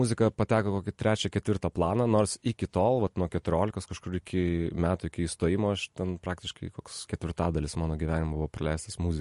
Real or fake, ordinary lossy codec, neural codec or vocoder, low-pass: real; MP3, 48 kbps; none; 14.4 kHz